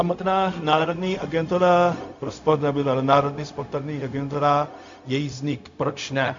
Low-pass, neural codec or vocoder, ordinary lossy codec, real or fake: 7.2 kHz; codec, 16 kHz, 0.4 kbps, LongCat-Audio-Codec; AAC, 64 kbps; fake